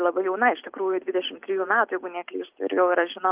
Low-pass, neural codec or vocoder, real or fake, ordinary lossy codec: 3.6 kHz; none; real; Opus, 32 kbps